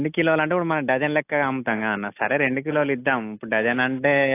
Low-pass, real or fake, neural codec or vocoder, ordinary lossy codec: 3.6 kHz; real; none; AAC, 24 kbps